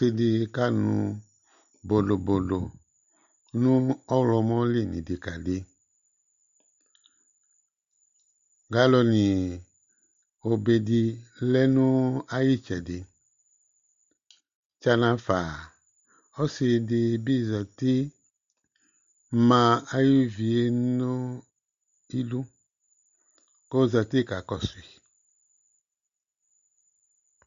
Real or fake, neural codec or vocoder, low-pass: real; none; 7.2 kHz